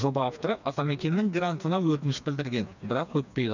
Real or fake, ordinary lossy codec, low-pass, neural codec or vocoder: fake; none; 7.2 kHz; codec, 16 kHz, 2 kbps, FreqCodec, smaller model